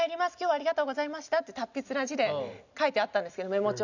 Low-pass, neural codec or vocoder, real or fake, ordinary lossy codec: 7.2 kHz; none; real; none